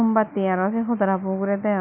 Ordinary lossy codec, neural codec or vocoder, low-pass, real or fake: none; none; 3.6 kHz; real